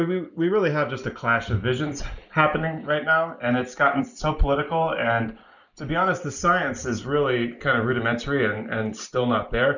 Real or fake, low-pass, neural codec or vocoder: real; 7.2 kHz; none